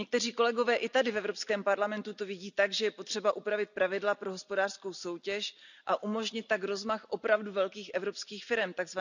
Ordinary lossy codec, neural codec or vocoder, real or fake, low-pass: none; none; real; 7.2 kHz